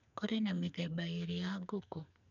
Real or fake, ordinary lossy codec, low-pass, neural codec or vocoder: fake; none; 7.2 kHz; codec, 44.1 kHz, 2.6 kbps, SNAC